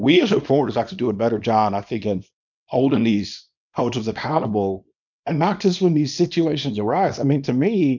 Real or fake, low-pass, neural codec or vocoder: fake; 7.2 kHz; codec, 24 kHz, 0.9 kbps, WavTokenizer, small release